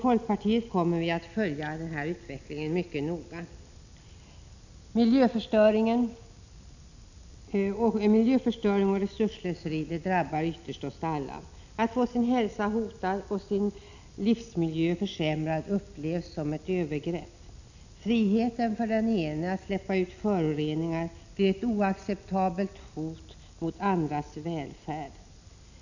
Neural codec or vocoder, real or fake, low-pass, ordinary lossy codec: none; real; 7.2 kHz; none